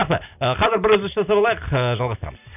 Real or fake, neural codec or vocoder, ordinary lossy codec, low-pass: real; none; none; 3.6 kHz